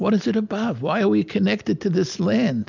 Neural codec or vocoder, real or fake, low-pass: none; real; 7.2 kHz